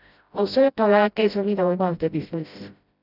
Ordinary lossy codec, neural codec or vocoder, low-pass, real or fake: none; codec, 16 kHz, 0.5 kbps, FreqCodec, smaller model; 5.4 kHz; fake